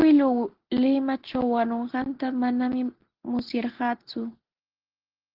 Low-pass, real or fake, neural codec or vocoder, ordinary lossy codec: 5.4 kHz; real; none; Opus, 16 kbps